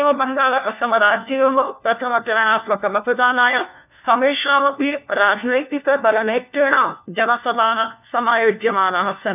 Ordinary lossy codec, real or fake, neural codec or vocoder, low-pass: none; fake; codec, 16 kHz, 1 kbps, FunCodec, trained on LibriTTS, 50 frames a second; 3.6 kHz